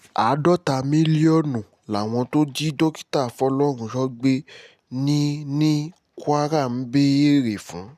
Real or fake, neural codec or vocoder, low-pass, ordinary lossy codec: real; none; 14.4 kHz; none